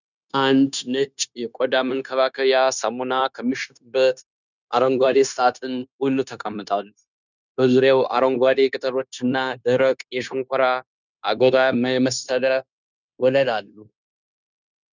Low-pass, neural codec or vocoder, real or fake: 7.2 kHz; codec, 16 kHz, 0.9 kbps, LongCat-Audio-Codec; fake